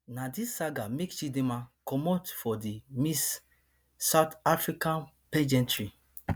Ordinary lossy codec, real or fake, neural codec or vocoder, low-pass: none; real; none; none